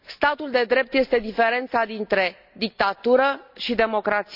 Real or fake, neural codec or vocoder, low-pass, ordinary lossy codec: real; none; 5.4 kHz; none